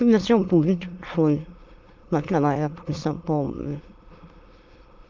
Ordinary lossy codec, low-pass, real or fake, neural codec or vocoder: Opus, 32 kbps; 7.2 kHz; fake; autoencoder, 22.05 kHz, a latent of 192 numbers a frame, VITS, trained on many speakers